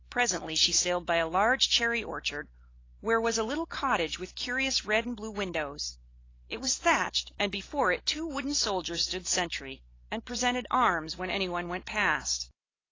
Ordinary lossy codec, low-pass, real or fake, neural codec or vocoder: AAC, 32 kbps; 7.2 kHz; real; none